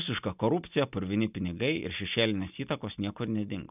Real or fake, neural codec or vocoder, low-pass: real; none; 3.6 kHz